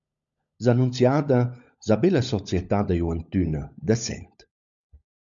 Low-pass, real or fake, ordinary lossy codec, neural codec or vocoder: 7.2 kHz; fake; MP3, 64 kbps; codec, 16 kHz, 16 kbps, FunCodec, trained on LibriTTS, 50 frames a second